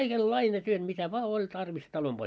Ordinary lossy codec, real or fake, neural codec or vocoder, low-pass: none; real; none; none